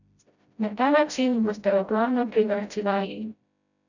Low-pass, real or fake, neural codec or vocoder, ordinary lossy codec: 7.2 kHz; fake; codec, 16 kHz, 0.5 kbps, FreqCodec, smaller model; none